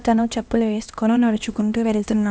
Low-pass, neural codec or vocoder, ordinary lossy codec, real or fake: none; codec, 16 kHz, 1 kbps, X-Codec, HuBERT features, trained on LibriSpeech; none; fake